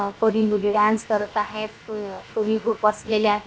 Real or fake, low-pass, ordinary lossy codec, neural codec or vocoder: fake; none; none; codec, 16 kHz, about 1 kbps, DyCAST, with the encoder's durations